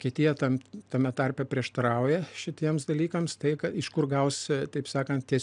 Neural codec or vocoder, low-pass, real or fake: none; 9.9 kHz; real